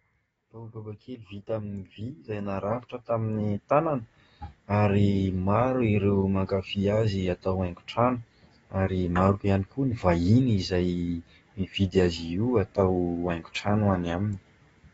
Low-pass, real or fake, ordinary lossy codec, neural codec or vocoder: 19.8 kHz; fake; AAC, 24 kbps; codec, 44.1 kHz, 7.8 kbps, DAC